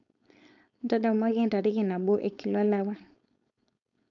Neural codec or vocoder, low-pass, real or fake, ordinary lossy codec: codec, 16 kHz, 4.8 kbps, FACodec; 7.2 kHz; fake; none